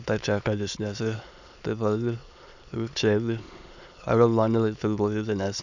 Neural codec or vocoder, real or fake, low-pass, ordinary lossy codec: autoencoder, 22.05 kHz, a latent of 192 numbers a frame, VITS, trained on many speakers; fake; 7.2 kHz; none